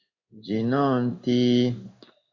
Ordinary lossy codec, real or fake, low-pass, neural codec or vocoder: Opus, 64 kbps; fake; 7.2 kHz; codec, 16 kHz in and 24 kHz out, 1 kbps, XY-Tokenizer